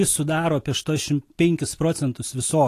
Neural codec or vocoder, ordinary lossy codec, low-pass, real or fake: none; AAC, 48 kbps; 14.4 kHz; real